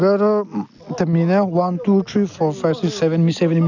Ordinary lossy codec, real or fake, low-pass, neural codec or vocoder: none; real; 7.2 kHz; none